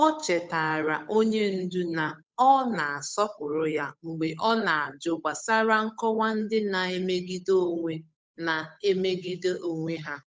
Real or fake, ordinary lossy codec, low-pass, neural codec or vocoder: fake; none; none; codec, 16 kHz, 8 kbps, FunCodec, trained on Chinese and English, 25 frames a second